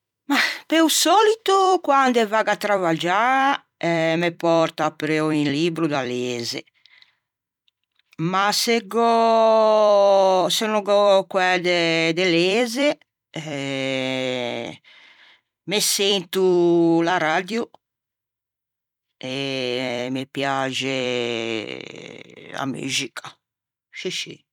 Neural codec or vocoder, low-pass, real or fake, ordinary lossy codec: vocoder, 44.1 kHz, 128 mel bands every 256 samples, BigVGAN v2; 19.8 kHz; fake; none